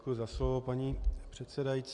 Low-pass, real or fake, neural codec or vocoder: 10.8 kHz; real; none